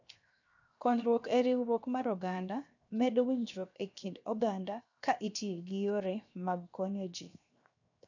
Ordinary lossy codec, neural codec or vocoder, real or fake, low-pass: none; codec, 16 kHz, 0.7 kbps, FocalCodec; fake; 7.2 kHz